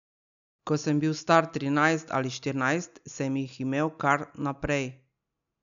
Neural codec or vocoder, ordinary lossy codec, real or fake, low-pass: none; none; real; 7.2 kHz